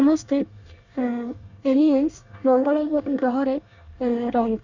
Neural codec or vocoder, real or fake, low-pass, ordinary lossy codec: codec, 24 kHz, 1 kbps, SNAC; fake; 7.2 kHz; Opus, 64 kbps